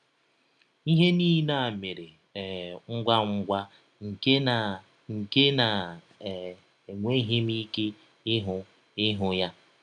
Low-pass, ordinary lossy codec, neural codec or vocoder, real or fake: 9.9 kHz; none; none; real